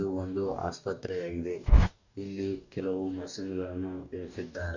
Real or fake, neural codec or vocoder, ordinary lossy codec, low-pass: fake; codec, 44.1 kHz, 2.6 kbps, DAC; none; 7.2 kHz